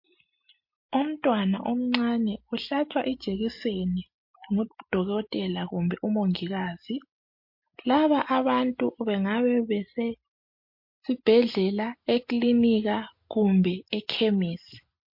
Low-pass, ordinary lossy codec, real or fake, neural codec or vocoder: 5.4 kHz; MP3, 32 kbps; real; none